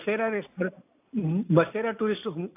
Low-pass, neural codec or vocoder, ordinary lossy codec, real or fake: 3.6 kHz; none; AAC, 24 kbps; real